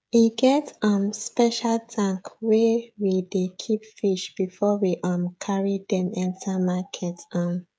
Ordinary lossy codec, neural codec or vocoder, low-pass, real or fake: none; codec, 16 kHz, 16 kbps, FreqCodec, smaller model; none; fake